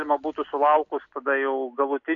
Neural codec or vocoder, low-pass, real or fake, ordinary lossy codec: none; 7.2 kHz; real; AAC, 48 kbps